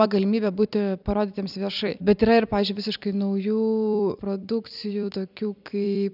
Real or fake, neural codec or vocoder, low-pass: fake; vocoder, 44.1 kHz, 128 mel bands every 256 samples, BigVGAN v2; 5.4 kHz